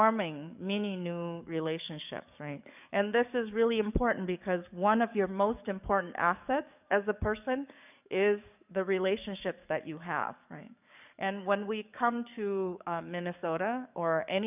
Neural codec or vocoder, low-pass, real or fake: codec, 44.1 kHz, 7.8 kbps, DAC; 3.6 kHz; fake